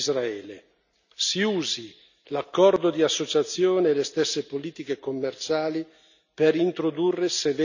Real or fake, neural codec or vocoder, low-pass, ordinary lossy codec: real; none; 7.2 kHz; none